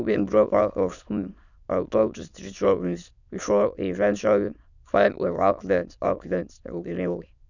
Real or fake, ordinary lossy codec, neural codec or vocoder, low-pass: fake; none; autoencoder, 22.05 kHz, a latent of 192 numbers a frame, VITS, trained on many speakers; 7.2 kHz